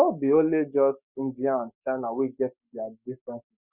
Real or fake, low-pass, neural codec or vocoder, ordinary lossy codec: fake; 3.6 kHz; codec, 44.1 kHz, 7.8 kbps, Pupu-Codec; Opus, 64 kbps